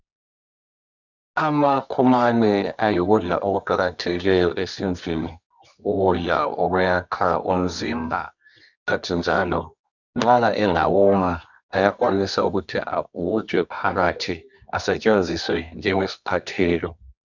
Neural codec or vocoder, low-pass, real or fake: codec, 24 kHz, 0.9 kbps, WavTokenizer, medium music audio release; 7.2 kHz; fake